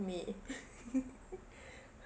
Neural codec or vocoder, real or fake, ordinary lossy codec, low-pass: none; real; none; none